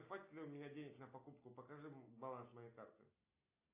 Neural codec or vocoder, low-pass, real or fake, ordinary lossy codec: none; 3.6 kHz; real; AAC, 32 kbps